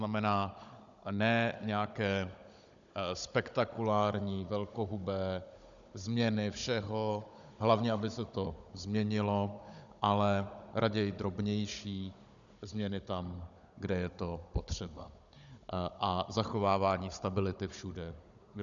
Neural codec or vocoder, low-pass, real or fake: codec, 16 kHz, 16 kbps, FunCodec, trained on Chinese and English, 50 frames a second; 7.2 kHz; fake